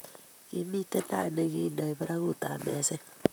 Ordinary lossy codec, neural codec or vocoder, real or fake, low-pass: none; vocoder, 44.1 kHz, 128 mel bands, Pupu-Vocoder; fake; none